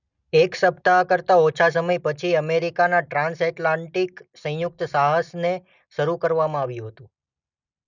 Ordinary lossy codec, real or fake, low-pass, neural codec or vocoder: none; real; 7.2 kHz; none